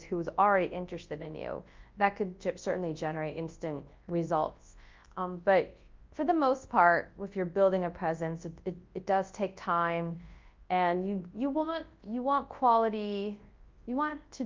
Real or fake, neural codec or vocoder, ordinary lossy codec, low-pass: fake; codec, 24 kHz, 0.9 kbps, WavTokenizer, large speech release; Opus, 32 kbps; 7.2 kHz